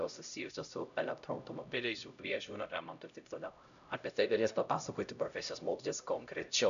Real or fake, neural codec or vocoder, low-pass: fake; codec, 16 kHz, 0.5 kbps, X-Codec, HuBERT features, trained on LibriSpeech; 7.2 kHz